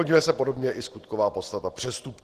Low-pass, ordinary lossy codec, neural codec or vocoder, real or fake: 14.4 kHz; Opus, 16 kbps; none; real